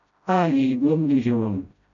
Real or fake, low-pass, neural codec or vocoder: fake; 7.2 kHz; codec, 16 kHz, 0.5 kbps, FreqCodec, smaller model